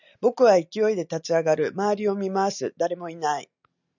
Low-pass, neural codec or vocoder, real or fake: 7.2 kHz; none; real